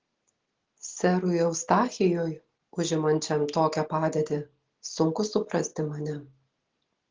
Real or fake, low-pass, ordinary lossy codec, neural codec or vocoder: real; 7.2 kHz; Opus, 16 kbps; none